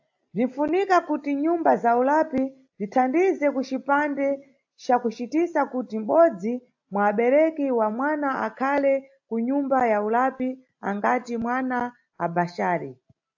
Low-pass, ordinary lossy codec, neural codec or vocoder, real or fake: 7.2 kHz; AAC, 48 kbps; none; real